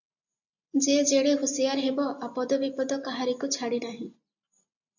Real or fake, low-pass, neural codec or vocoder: real; 7.2 kHz; none